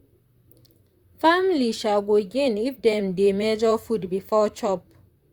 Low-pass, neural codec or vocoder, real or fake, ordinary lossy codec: 19.8 kHz; vocoder, 44.1 kHz, 128 mel bands, Pupu-Vocoder; fake; Opus, 64 kbps